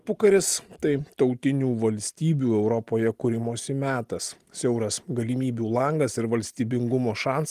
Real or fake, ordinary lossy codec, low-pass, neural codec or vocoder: real; Opus, 24 kbps; 14.4 kHz; none